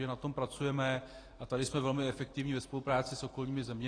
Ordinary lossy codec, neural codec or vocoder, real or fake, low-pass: AAC, 32 kbps; none; real; 9.9 kHz